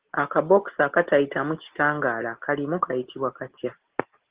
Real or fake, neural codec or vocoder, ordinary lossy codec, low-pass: real; none; Opus, 16 kbps; 3.6 kHz